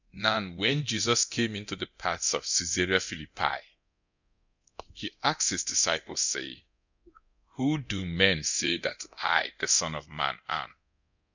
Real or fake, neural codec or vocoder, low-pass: fake; codec, 24 kHz, 0.9 kbps, DualCodec; 7.2 kHz